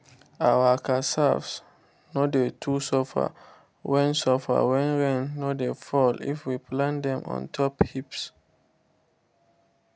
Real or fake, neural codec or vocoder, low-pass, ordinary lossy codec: real; none; none; none